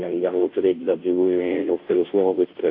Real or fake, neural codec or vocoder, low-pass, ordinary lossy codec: fake; codec, 16 kHz, 0.5 kbps, FunCodec, trained on Chinese and English, 25 frames a second; 5.4 kHz; AAC, 32 kbps